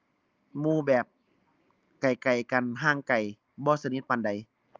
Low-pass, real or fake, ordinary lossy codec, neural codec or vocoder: 7.2 kHz; real; Opus, 32 kbps; none